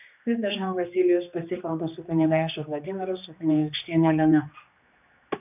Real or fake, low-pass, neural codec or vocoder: fake; 3.6 kHz; codec, 16 kHz, 2 kbps, X-Codec, HuBERT features, trained on general audio